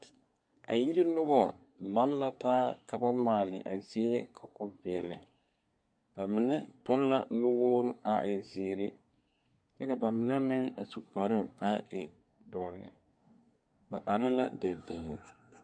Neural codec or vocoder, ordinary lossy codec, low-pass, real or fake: codec, 24 kHz, 1 kbps, SNAC; MP3, 64 kbps; 9.9 kHz; fake